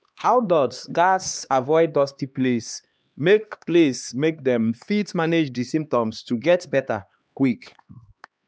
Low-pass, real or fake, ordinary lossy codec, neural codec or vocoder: none; fake; none; codec, 16 kHz, 2 kbps, X-Codec, HuBERT features, trained on LibriSpeech